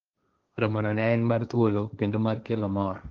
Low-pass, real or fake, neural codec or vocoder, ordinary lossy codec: 7.2 kHz; fake; codec, 16 kHz, 1.1 kbps, Voila-Tokenizer; Opus, 32 kbps